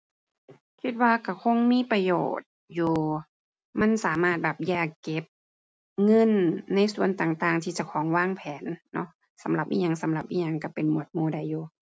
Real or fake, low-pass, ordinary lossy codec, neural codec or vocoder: real; none; none; none